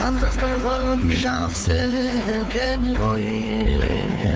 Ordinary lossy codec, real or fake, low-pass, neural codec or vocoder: none; fake; none; codec, 16 kHz, 4 kbps, X-Codec, WavLM features, trained on Multilingual LibriSpeech